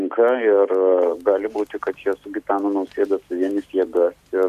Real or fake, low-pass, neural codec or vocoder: real; 14.4 kHz; none